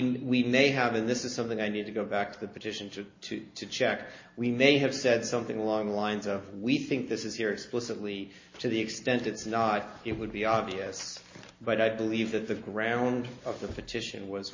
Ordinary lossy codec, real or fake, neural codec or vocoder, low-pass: MP3, 32 kbps; real; none; 7.2 kHz